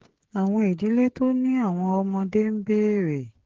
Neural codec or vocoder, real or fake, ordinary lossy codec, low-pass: codec, 16 kHz, 8 kbps, FreqCodec, smaller model; fake; Opus, 16 kbps; 7.2 kHz